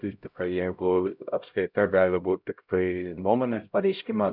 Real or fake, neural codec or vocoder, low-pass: fake; codec, 16 kHz, 0.5 kbps, X-Codec, HuBERT features, trained on LibriSpeech; 5.4 kHz